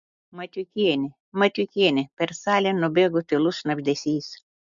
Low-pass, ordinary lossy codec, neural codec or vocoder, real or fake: 7.2 kHz; MP3, 64 kbps; none; real